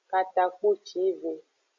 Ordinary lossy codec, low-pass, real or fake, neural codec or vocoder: AAC, 48 kbps; 7.2 kHz; real; none